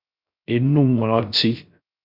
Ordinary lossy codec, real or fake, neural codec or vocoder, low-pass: MP3, 48 kbps; fake; codec, 16 kHz, 0.3 kbps, FocalCodec; 5.4 kHz